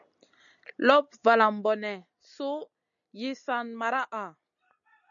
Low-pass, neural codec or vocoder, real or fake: 7.2 kHz; none; real